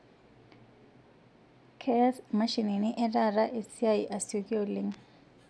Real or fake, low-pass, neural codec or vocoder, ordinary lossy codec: real; none; none; none